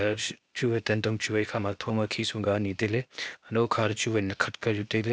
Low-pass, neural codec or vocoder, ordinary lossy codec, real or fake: none; codec, 16 kHz, 0.8 kbps, ZipCodec; none; fake